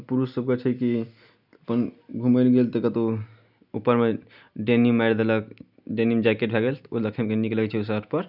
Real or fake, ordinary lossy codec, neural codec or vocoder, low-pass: real; none; none; 5.4 kHz